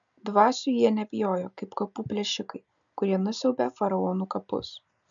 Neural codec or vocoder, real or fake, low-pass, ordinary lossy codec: none; real; 7.2 kHz; MP3, 96 kbps